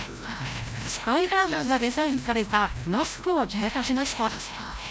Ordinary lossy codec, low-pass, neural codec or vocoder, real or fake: none; none; codec, 16 kHz, 0.5 kbps, FreqCodec, larger model; fake